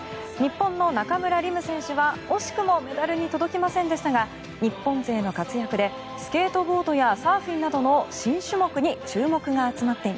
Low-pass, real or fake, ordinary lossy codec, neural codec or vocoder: none; real; none; none